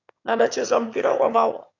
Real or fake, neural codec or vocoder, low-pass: fake; autoencoder, 22.05 kHz, a latent of 192 numbers a frame, VITS, trained on one speaker; 7.2 kHz